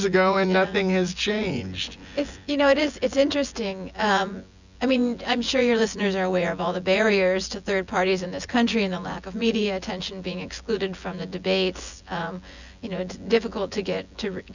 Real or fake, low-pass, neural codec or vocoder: fake; 7.2 kHz; vocoder, 24 kHz, 100 mel bands, Vocos